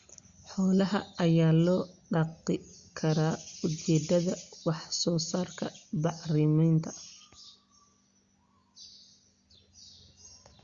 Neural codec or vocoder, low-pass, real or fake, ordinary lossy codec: none; 7.2 kHz; real; Opus, 64 kbps